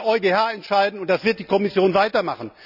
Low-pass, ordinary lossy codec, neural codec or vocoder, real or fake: 5.4 kHz; none; none; real